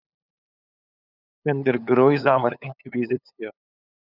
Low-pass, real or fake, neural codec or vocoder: 5.4 kHz; fake; codec, 16 kHz, 8 kbps, FunCodec, trained on LibriTTS, 25 frames a second